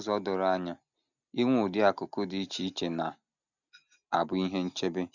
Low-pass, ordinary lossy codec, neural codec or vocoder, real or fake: 7.2 kHz; none; none; real